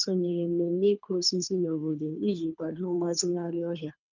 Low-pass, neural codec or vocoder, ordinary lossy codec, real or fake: 7.2 kHz; codec, 24 kHz, 3 kbps, HILCodec; none; fake